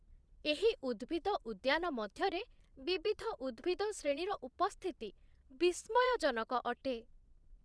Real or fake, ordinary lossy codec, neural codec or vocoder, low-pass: fake; none; vocoder, 22.05 kHz, 80 mel bands, Vocos; none